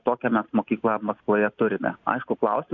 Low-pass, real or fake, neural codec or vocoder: 7.2 kHz; real; none